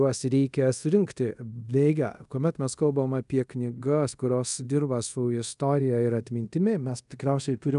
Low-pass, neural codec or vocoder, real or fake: 10.8 kHz; codec, 24 kHz, 0.5 kbps, DualCodec; fake